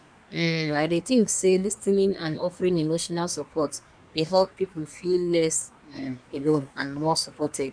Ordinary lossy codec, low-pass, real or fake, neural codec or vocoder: none; 9.9 kHz; fake; codec, 24 kHz, 1 kbps, SNAC